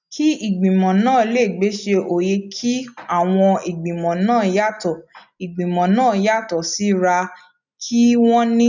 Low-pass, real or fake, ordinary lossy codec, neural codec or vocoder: 7.2 kHz; real; none; none